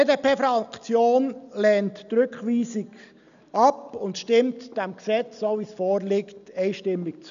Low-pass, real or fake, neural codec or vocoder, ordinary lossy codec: 7.2 kHz; real; none; none